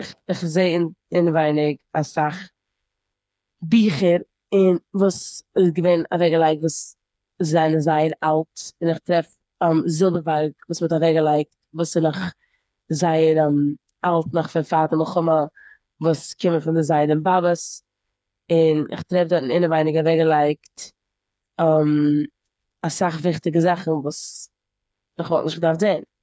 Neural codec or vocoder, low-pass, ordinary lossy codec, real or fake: codec, 16 kHz, 4 kbps, FreqCodec, smaller model; none; none; fake